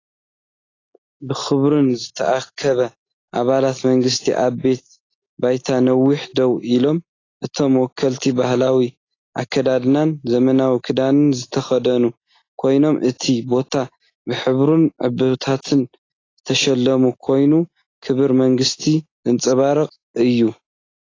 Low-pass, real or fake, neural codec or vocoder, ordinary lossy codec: 7.2 kHz; real; none; AAC, 32 kbps